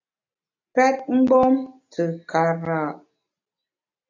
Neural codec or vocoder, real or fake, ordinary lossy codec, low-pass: none; real; AAC, 48 kbps; 7.2 kHz